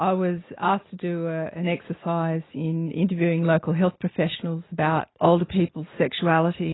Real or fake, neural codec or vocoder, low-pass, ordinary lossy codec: real; none; 7.2 kHz; AAC, 16 kbps